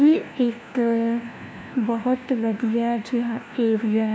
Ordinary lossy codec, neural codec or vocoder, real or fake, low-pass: none; codec, 16 kHz, 1 kbps, FunCodec, trained on LibriTTS, 50 frames a second; fake; none